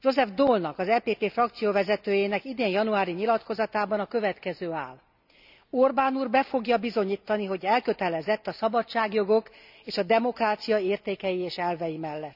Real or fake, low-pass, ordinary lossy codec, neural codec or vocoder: real; 5.4 kHz; none; none